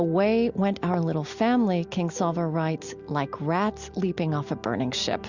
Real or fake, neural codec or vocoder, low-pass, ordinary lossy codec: real; none; 7.2 kHz; Opus, 64 kbps